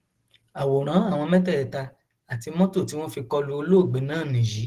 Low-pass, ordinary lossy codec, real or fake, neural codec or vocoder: 14.4 kHz; Opus, 16 kbps; real; none